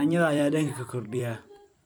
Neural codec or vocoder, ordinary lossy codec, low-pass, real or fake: vocoder, 44.1 kHz, 128 mel bands every 256 samples, BigVGAN v2; none; none; fake